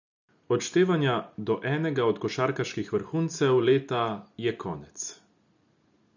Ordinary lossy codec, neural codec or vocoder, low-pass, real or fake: none; none; 7.2 kHz; real